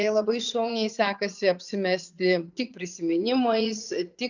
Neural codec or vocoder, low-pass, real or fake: vocoder, 44.1 kHz, 80 mel bands, Vocos; 7.2 kHz; fake